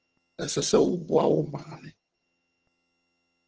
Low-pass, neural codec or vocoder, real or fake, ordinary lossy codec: 7.2 kHz; vocoder, 22.05 kHz, 80 mel bands, HiFi-GAN; fake; Opus, 16 kbps